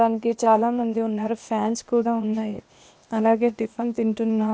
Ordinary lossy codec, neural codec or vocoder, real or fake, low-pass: none; codec, 16 kHz, 0.8 kbps, ZipCodec; fake; none